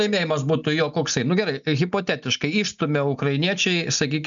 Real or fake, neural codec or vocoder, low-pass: real; none; 7.2 kHz